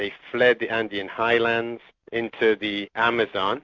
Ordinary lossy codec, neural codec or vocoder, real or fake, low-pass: MP3, 48 kbps; none; real; 7.2 kHz